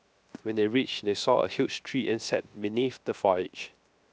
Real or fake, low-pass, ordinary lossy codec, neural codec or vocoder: fake; none; none; codec, 16 kHz, 0.7 kbps, FocalCodec